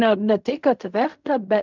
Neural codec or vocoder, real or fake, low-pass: codec, 16 kHz in and 24 kHz out, 0.4 kbps, LongCat-Audio-Codec, fine tuned four codebook decoder; fake; 7.2 kHz